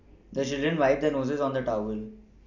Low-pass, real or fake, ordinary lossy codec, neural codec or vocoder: 7.2 kHz; real; none; none